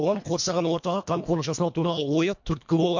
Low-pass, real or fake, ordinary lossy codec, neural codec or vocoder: 7.2 kHz; fake; MP3, 48 kbps; codec, 24 kHz, 1.5 kbps, HILCodec